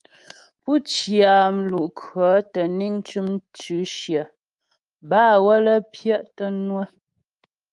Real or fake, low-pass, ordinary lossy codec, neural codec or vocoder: fake; 10.8 kHz; Opus, 32 kbps; codec, 24 kHz, 3.1 kbps, DualCodec